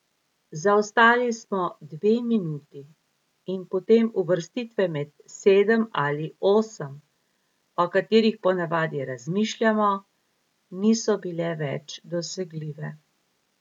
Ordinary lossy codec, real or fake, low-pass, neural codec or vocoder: none; real; 19.8 kHz; none